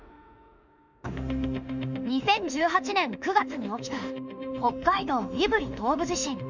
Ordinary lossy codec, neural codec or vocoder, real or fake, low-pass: none; autoencoder, 48 kHz, 32 numbers a frame, DAC-VAE, trained on Japanese speech; fake; 7.2 kHz